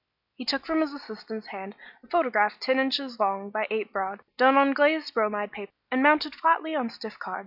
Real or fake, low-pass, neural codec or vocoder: real; 5.4 kHz; none